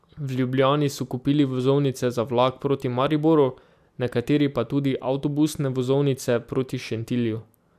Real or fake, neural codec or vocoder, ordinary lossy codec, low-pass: real; none; AAC, 96 kbps; 14.4 kHz